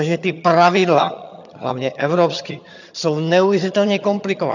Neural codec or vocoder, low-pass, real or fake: vocoder, 22.05 kHz, 80 mel bands, HiFi-GAN; 7.2 kHz; fake